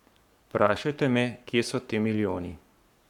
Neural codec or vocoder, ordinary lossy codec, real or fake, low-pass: codec, 44.1 kHz, 7.8 kbps, Pupu-Codec; none; fake; 19.8 kHz